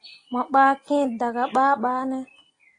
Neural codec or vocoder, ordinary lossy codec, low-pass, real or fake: none; AAC, 32 kbps; 9.9 kHz; real